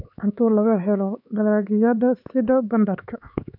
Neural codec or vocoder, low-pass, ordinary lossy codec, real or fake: codec, 16 kHz, 4 kbps, X-Codec, HuBERT features, trained on LibriSpeech; 5.4 kHz; none; fake